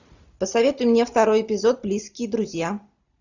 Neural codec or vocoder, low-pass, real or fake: none; 7.2 kHz; real